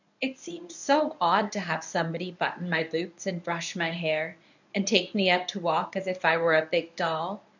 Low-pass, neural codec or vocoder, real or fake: 7.2 kHz; codec, 24 kHz, 0.9 kbps, WavTokenizer, medium speech release version 1; fake